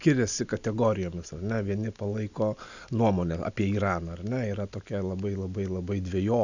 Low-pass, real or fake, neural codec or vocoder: 7.2 kHz; real; none